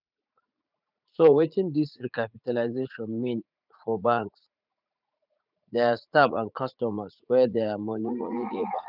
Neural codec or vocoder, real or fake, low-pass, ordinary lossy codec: vocoder, 44.1 kHz, 80 mel bands, Vocos; fake; 5.4 kHz; none